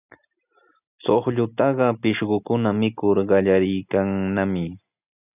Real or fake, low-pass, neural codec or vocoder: real; 3.6 kHz; none